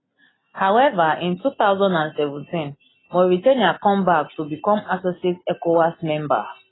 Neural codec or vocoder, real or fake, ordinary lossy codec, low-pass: none; real; AAC, 16 kbps; 7.2 kHz